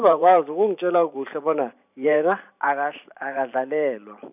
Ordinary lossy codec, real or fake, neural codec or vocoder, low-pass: none; fake; vocoder, 44.1 kHz, 128 mel bands every 512 samples, BigVGAN v2; 3.6 kHz